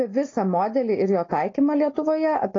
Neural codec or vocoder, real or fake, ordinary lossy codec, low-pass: none; real; AAC, 32 kbps; 7.2 kHz